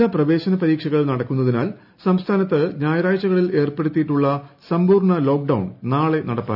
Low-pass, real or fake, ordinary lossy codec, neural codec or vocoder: 5.4 kHz; real; none; none